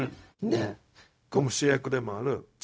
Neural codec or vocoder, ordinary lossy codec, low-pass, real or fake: codec, 16 kHz, 0.4 kbps, LongCat-Audio-Codec; none; none; fake